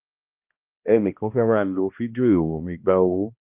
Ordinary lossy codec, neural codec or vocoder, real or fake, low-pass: Opus, 24 kbps; codec, 16 kHz, 1 kbps, X-Codec, HuBERT features, trained on balanced general audio; fake; 3.6 kHz